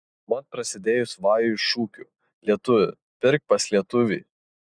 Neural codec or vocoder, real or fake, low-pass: none; real; 9.9 kHz